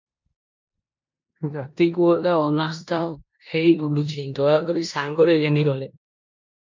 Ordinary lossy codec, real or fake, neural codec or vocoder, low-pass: MP3, 48 kbps; fake; codec, 16 kHz in and 24 kHz out, 0.9 kbps, LongCat-Audio-Codec, four codebook decoder; 7.2 kHz